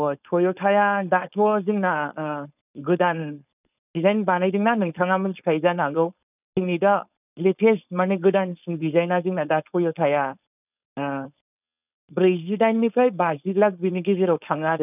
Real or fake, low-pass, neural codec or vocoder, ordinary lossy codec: fake; 3.6 kHz; codec, 16 kHz, 4.8 kbps, FACodec; none